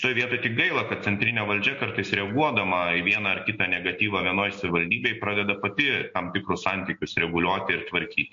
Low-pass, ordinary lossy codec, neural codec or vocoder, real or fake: 7.2 kHz; MP3, 48 kbps; none; real